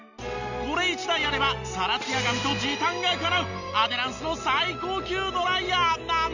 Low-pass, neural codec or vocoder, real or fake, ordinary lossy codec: 7.2 kHz; none; real; none